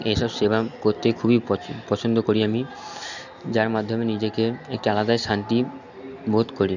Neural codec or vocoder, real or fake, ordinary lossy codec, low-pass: none; real; none; 7.2 kHz